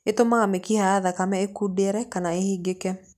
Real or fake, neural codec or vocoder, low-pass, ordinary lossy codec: real; none; 14.4 kHz; none